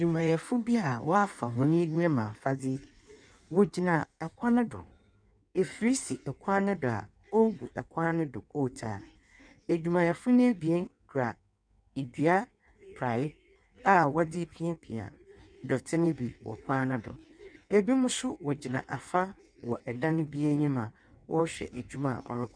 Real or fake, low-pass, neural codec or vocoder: fake; 9.9 kHz; codec, 16 kHz in and 24 kHz out, 1.1 kbps, FireRedTTS-2 codec